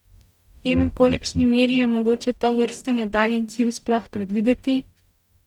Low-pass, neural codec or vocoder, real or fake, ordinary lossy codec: 19.8 kHz; codec, 44.1 kHz, 0.9 kbps, DAC; fake; none